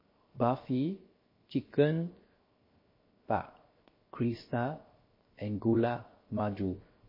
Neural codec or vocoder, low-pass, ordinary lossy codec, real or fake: codec, 16 kHz, 0.7 kbps, FocalCodec; 5.4 kHz; MP3, 24 kbps; fake